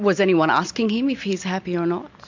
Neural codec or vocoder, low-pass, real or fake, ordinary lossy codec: none; 7.2 kHz; real; MP3, 48 kbps